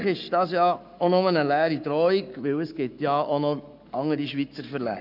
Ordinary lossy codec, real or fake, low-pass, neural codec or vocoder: none; fake; 5.4 kHz; codec, 44.1 kHz, 7.8 kbps, Pupu-Codec